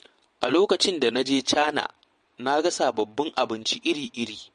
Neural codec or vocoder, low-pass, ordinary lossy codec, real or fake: vocoder, 22.05 kHz, 80 mel bands, WaveNeXt; 9.9 kHz; MP3, 48 kbps; fake